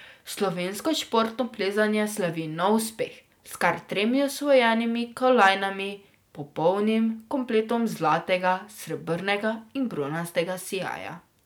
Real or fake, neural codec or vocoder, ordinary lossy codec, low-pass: real; none; none; none